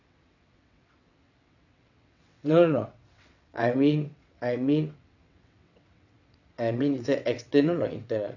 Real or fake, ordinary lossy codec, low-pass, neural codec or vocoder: fake; none; 7.2 kHz; vocoder, 22.05 kHz, 80 mel bands, WaveNeXt